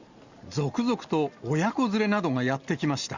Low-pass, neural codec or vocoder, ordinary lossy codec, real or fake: 7.2 kHz; none; Opus, 64 kbps; real